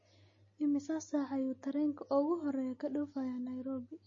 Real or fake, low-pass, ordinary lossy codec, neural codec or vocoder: real; 7.2 kHz; MP3, 32 kbps; none